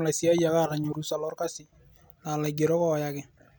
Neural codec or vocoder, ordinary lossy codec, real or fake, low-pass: none; none; real; none